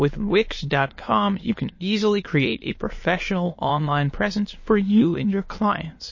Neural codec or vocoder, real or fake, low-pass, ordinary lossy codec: autoencoder, 22.05 kHz, a latent of 192 numbers a frame, VITS, trained on many speakers; fake; 7.2 kHz; MP3, 32 kbps